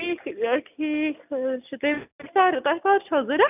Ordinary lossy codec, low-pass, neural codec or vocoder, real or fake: none; 3.6 kHz; none; real